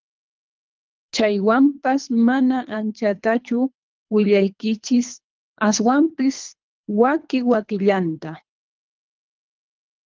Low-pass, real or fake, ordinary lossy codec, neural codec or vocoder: 7.2 kHz; fake; Opus, 24 kbps; codec, 24 kHz, 3 kbps, HILCodec